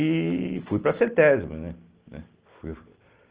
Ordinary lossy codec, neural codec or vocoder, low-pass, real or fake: Opus, 16 kbps; none; 3.6 kHz; real